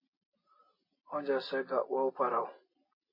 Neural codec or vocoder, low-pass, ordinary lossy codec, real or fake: none; 5.4 kHz; MP3, 24 kbps; real